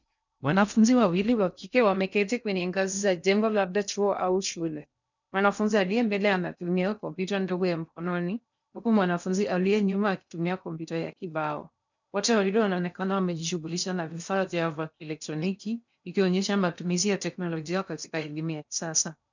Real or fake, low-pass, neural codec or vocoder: fake; 7.2 kHz; codec, 16 kHz in and 24 kHz out, 0.6 kbps, FocalCodec, streaming, 2048 codes